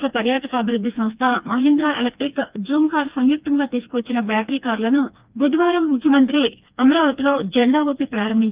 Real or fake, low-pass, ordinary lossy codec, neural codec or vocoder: fake; 3.6 kHz; Opus, 32 kbps; codec, 16 kHz, 2 kbps, FreqCodec, smaller model